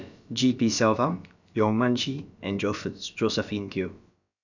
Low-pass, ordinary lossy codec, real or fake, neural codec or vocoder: 7.2 kHz; none; fake; codec, 16 kHz, about 1 kbps, DyCAST, with the encoder's durations